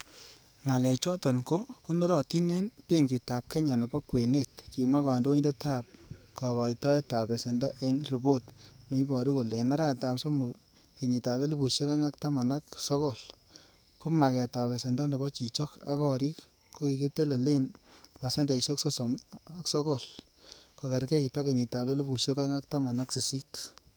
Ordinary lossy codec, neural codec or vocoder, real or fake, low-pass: none; codec, 44.1 kHz, 2.6 kbps, SNAC; fake; none